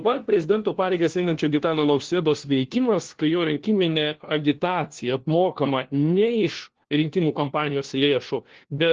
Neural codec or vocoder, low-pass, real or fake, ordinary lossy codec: codec, 16 kHz, 1 kbps, FunCodec, trained on LibriTTS, 50 frames a second; 7.2 kHz; fake; Opus, 16 kbps